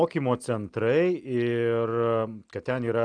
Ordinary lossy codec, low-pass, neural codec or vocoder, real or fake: Opus, 32 kbps; 9.9 kHz; none; real